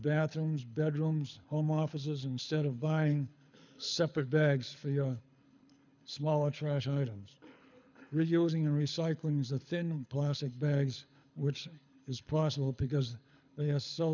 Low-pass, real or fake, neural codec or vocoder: 7.2 kHz; fake; codec, 24 kHz, 6 kbps, HILCodec